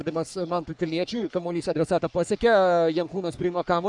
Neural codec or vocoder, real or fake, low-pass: codec, 44.1 kHz, 3.4 kbps, Pupu-Codec; fake; 10.8 kHz